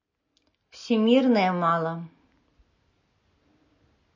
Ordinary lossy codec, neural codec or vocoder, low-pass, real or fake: MP3, 32 kbps; none; 7.2 kHz; real